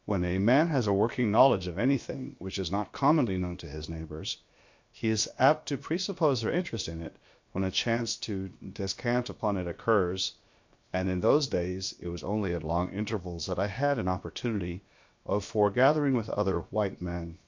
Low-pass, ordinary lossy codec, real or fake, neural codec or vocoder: 7.2 kHz; MP3, 48 kbps; fake; codec, 16 kHz, about 1 kbps, DyCAST, with the encoder's durations